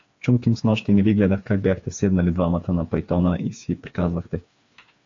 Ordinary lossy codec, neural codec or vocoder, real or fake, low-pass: AAC, 48 kbps; codec, 16 kHz, 4 kbps, FreqCodec, smaller model; fake; 7.2 kHz